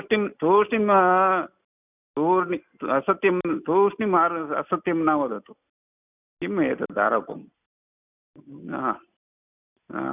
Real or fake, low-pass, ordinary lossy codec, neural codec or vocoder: real; 3.6 kHz; none; none